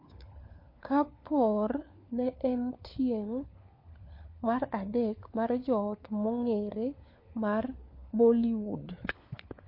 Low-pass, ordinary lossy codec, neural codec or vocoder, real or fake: 5.4 kHz; MP3, 32 kbps; codec, 16 kHz, 4 kbps, FunCodec, trained on LibriTTS, 50 frames a second; fake